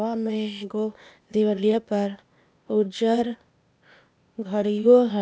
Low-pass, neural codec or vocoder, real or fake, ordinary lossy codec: none; codec, 16 kHz, 0.8 kbps, ZipCodec; fake; none